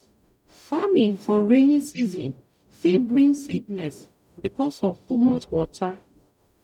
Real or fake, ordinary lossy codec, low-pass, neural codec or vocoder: fake; none; 19.8 kHz; codec, 44.1 kHz, 0.9 kbps, DAC